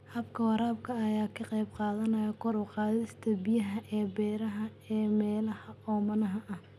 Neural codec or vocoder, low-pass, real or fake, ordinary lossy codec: none; 14.4 kHz; real; none